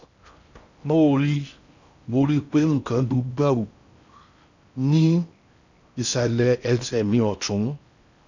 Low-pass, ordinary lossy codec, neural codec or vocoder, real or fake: 7.2 kHz; none; codec, 16 kHz in and 24 kHz out, 0.6 kbps, FocalCodec, streaming, 2048 codes; fake